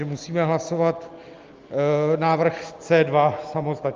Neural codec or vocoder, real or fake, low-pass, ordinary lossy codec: none; real; 7.2 kHz; Opus, 32 kbps